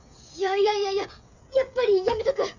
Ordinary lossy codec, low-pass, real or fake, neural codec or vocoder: none; 7.2 kHz; fake; codec, 16 kHz, 8 kbps, FreqCodec, smaller model